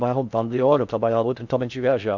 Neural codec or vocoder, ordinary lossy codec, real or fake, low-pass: codec, 16 kHz in and 24 kHz out, 0.6 kbps, FocalCodec, streaming, 4096 codes; none; fake; 7.2 kHz